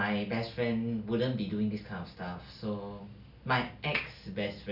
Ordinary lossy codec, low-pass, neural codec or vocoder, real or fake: none; 5.4 kHz; none; real